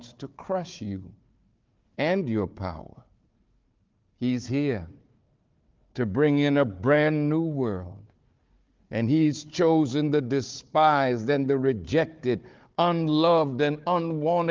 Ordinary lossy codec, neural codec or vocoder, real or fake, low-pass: Opus, 32 kbps; codec, 16 kHz, 4 kbps, FunCodec, trained on Chinese and English, 50 frames a second; fake; 7.2 kHz